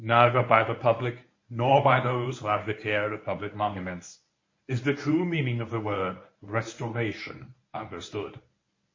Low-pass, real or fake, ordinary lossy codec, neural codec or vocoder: 7.2 kHz; fake; MP3, 32 kbps; codec, 24 kHz, 0.9 kbps, WavTokenizer, medium speech release version 1